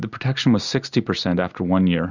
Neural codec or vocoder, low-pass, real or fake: none; 7.2 kHz; real